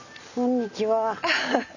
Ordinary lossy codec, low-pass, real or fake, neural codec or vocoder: none; 7.2 kHz; real; none